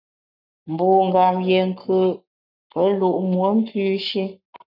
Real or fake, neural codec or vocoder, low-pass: fake; codec, 44.1 kHz, 7.8 kbps, Pupu-Codec; 5.4 kHz